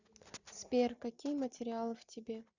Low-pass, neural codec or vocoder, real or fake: 7.2 kHz; none; real